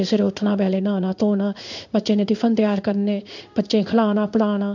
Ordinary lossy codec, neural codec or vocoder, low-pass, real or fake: none; codec, 16 kHz in and 24 kHz out, 1 kbps, XY-Tokenizer; 7.2 kHz; fake